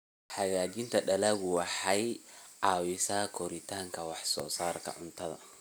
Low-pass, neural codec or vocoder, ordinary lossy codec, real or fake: none; none; none; real